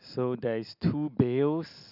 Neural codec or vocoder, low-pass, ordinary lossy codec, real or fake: none; 5.4 kHz; none; real